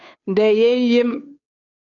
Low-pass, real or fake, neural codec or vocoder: 7.2 kHz; fake; codec, 16 kHz, 2 kbps, FunCodec, trained on Chinese and English, 25 frames a second